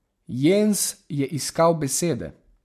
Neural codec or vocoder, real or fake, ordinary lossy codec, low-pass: none; real; MP3, 64 kbps; 14.4 kHz